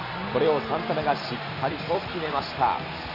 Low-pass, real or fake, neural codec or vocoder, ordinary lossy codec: 5.4 kHz; fake; vocoder, 44.1 kHz, 128 mel bands every 256 samples, BigVGAN v2; none